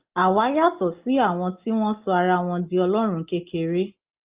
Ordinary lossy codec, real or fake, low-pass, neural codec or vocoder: Opus, 32 kbps; real; 3.6 kHz; none